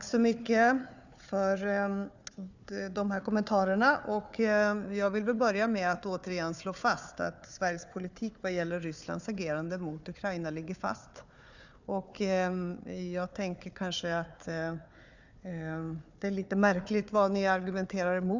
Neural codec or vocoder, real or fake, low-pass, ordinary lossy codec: codec, 16 kHz, 4 kbps, FunCodec, trained on Chinese and English, 50 frames a second; fake; 7.2 kHz; none